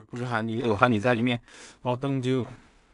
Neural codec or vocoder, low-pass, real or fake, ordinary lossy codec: codec, 16 kHz in and 24 kHz out, 0.4 kbps, LongCat-Audio-Codec, two codebook decoder; 10.8 kHz; fake; none